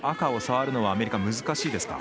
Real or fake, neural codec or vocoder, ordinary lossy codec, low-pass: real; none; none; none